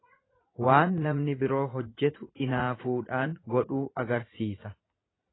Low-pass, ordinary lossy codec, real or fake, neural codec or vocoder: 7.2 kHz; AAC, 16 kbps; fake; vocoder, 44.1 kHz, 80 mel bands, Vocos